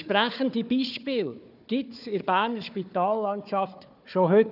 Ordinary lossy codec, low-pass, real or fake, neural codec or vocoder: none; 5.4 kHz; fake; codec, 16 kHz, 4 kbps, FunCodec, trained on Chinese and English, 50 frames a second